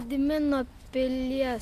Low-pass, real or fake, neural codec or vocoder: 14.4 kHz; real; none